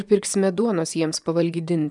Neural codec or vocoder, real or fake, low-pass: vocoder, 24 kHz, 100 mel bands, Vocos; fake; 10.8 kHz